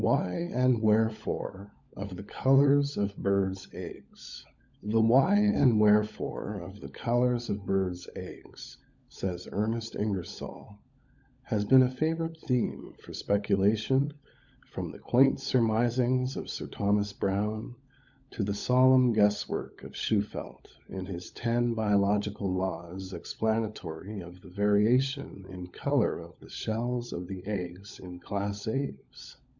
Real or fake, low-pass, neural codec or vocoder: fake; 7.2 kHz; codec, 16 kHz, 16 kbps, FunCodec, trained on LibriTTS, 50 frames a second